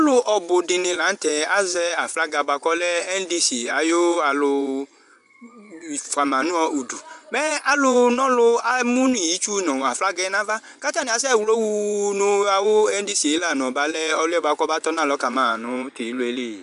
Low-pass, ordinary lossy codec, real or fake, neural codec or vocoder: 10.8 kHz; AAC, 96 kbps; fake; vocoder, 24 kHz, 100 mel bands, Vocos